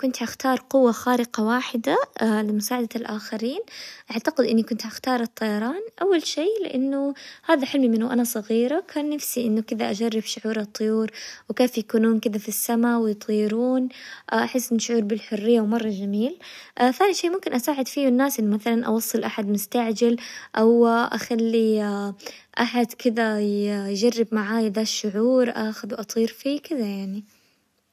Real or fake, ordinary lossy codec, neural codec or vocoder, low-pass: real; none; none; 14.4 kHz